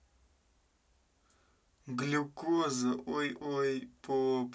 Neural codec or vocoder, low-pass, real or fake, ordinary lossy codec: none; none; real; none